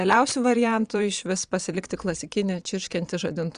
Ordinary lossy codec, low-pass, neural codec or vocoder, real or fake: Opus, 64 kbps; 9.9 kHz; vocoder, 22.05 kHz, 80 mel bands, Vocos; fake